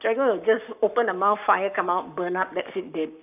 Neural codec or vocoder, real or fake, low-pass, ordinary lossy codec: codec, 44.1 kHz, 7.8 kbps, Pupu-Codec; fake; 3.6 kHz; none